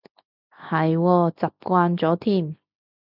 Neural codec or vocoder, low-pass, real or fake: none; 5.4 kHz; real